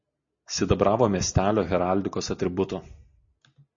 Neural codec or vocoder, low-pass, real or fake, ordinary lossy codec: none; 7.2 kHz; real; MP3, 32 kbps